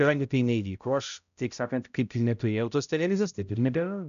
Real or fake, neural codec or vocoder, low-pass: fake; codec, 16 kHz, 0.5 kbps, X-Codec, HuBERT features, trained on balanced general audio; 7.2 kHz